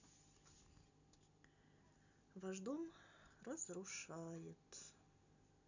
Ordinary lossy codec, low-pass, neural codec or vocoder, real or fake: none; 7.2 kHz; none; real